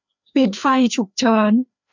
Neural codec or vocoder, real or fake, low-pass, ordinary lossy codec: codec, 16 kHz, 2 kbps, FreqCodec, larger model; fake; 7.2 kHz; none